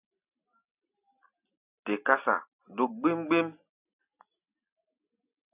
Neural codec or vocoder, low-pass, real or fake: none; 3.6 kHz; real